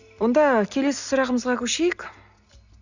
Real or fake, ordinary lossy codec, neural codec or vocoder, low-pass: real; none; none; 7.2 kHz